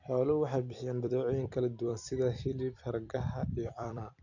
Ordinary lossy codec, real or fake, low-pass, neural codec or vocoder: none; fake; 7.2 kHz; vocoder, 44.1 kHz, 128 mel bands every 256 samples, BigVGAN v2